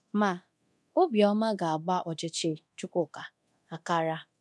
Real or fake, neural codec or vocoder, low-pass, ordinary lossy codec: fake; codec, 24 kHz, 0.9 kbps, DualCodec; none; none